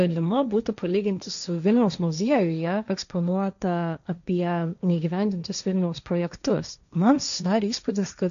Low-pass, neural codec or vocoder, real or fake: 7.2 kHz; codec, 16 kHz, 1.1 kbps, Voila-Tokenizer; fake